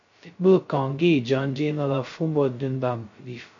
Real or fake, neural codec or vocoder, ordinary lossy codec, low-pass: fake; codec, 16 kHz, 0.2 kbps, FocalCodec; MP3, 48 kbps; 7.2 kHz